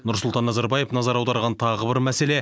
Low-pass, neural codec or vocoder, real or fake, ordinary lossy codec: none; none; real; none